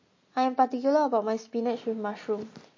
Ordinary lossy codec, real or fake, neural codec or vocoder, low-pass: MP3, 32 kbps; real; none; 7.2 kHz